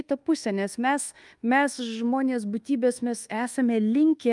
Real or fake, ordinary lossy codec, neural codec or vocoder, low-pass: fake; Opus, 32 kbps; codec, 24 kHz, 1.2 kbps, DualCodec; 10.8 kHz